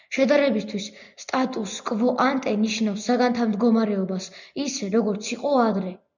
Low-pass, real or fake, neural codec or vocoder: 7.2 kHz; real; none